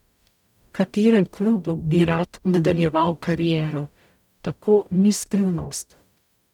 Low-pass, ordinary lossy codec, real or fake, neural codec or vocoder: 19.8 kHz; none; fake; codec, 44.1 kHz, 0.9 kbps, DAC